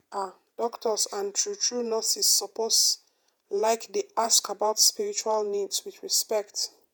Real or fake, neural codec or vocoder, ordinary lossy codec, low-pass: fake; vocoder, 48 kHz, 128 mel bands, Vocos; none; none